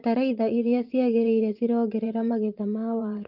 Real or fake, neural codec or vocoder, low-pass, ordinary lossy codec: fake; vocoder, 24 kHz, 100 mel bands, Vocos; 5.4 kHz; Opus, 24 kbps